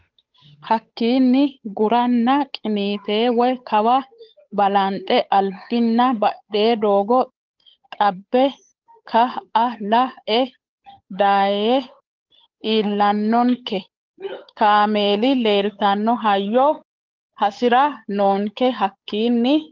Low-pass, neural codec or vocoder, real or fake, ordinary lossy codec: 7.2 kHz; codec, 16 kHz, 8 kbps, FunCodec, trained on Chinese and English, 25 frames a second; fake; Opus, 32 kbps